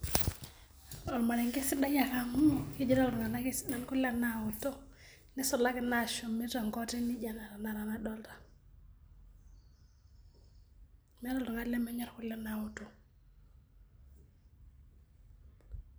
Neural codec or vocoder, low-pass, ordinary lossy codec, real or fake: none; none; none; real